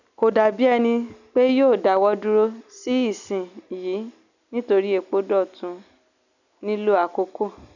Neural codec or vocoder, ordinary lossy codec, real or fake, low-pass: none; none; real; 7.2 kHz